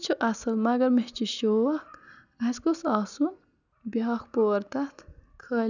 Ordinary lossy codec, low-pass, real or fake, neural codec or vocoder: none; 7.2 kHz; real; none